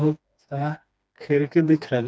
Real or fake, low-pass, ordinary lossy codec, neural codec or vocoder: fake; none; none; codec, 16 kHz, 2 kbps, FreqCodec, smaller model